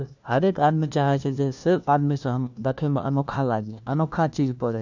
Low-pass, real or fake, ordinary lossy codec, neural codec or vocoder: 7.2 kHz; fake; none; codec, 16 kHz, 1 kbps, FunCodec, trained on LibriTTS, 50 frames a second